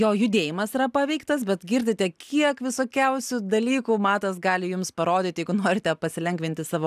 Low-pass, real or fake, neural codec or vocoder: 14.4 kHz; real; none